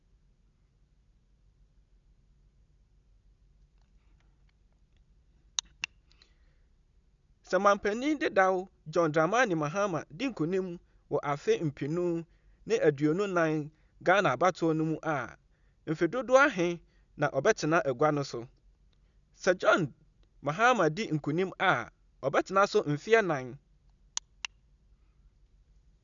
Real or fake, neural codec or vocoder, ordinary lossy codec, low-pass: real; none; none; 7.2 kHz